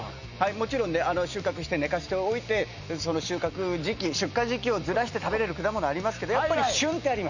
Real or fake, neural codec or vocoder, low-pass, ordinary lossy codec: real; none; 7.2 kHz; none